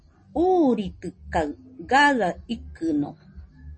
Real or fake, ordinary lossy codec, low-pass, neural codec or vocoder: real; MP3, 32 kbps; 9.9 kHz; none